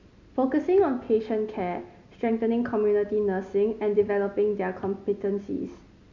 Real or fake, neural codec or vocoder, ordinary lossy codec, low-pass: real; none; MP3, 64 kbps; 7.2 kHz